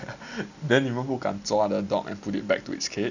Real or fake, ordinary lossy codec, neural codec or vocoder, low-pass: real; none; none; 7.2 kHz